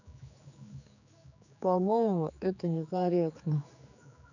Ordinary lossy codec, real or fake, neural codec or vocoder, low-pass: none; fake; codec, 16 kHz, 4 kbps, X-Codec, HuBERT features, trained on general audio; 7.2 kHz